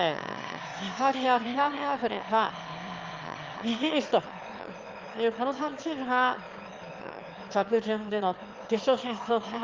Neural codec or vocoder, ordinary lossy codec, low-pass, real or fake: autoencoder, 22.05 kHz, a latent of 192 numbers a frame, VITS, trained on one speaker; Opus, 32 kbps; 7.2 kHz; fake